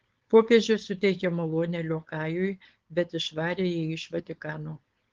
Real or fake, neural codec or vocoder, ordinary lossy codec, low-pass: fake; codec, 16 kHz, 4.8 kbps, FACodec; Opus, 16 kbps; 7.2 kHz